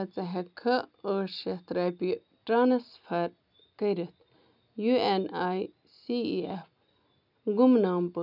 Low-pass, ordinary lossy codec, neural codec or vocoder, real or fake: 5.4 kHz; none; none; real